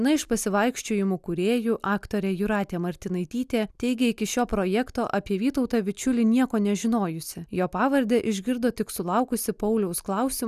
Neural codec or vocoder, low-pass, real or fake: none; 14.4 kHz; real